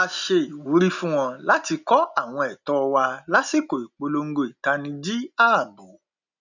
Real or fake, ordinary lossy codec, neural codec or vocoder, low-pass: real; none; none; 7.2 kHz